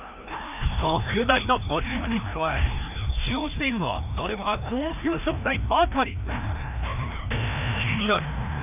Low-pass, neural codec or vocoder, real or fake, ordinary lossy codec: 3.6 kHz; codec, 16 kHz, 1 kbps, FreqCodec, larger model; fake; none